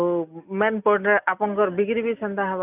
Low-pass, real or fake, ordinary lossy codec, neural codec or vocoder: 3.6 kHz; real; none; none